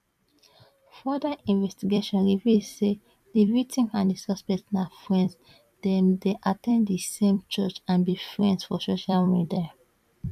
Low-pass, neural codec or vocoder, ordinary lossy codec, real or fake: 14.4 kHz; vocoder, 44.1 kHz, 128 mel bands every 256 samples, BigVGAN v2; none; fake